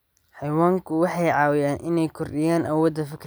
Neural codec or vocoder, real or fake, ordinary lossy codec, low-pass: none; real; none; none